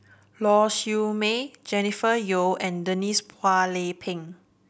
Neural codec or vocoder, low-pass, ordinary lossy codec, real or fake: none; none; none; real